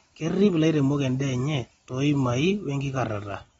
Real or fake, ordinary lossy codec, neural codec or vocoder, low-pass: real; AAC, 24 kbps; none; 10.8 kHz